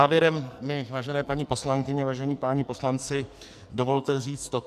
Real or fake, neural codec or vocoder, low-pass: fake; codec, 44.1 kHz, 2.6 kbps, SNAC; 14.4 kHz